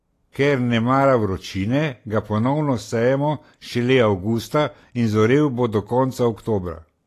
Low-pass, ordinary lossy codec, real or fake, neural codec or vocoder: 14.4 kHz; AAC, 48 kbps; real; none